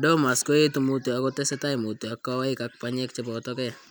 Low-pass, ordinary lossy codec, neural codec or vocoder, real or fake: none; none; none; real